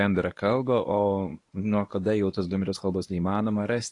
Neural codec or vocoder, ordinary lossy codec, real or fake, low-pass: codec, 24 kHz, 0.9 kbps, WavTokenizer, medium speech release version 1; AAC, 48 kbps; fake; 10.8 kHz